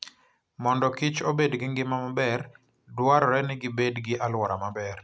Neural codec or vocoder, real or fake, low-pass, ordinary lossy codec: none; real; none; none